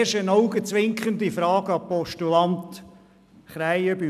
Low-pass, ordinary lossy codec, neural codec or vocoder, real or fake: 14.4 kHz; none; none; real